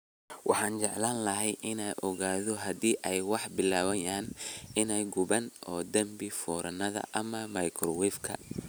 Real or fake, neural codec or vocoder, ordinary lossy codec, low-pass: real; none; none; none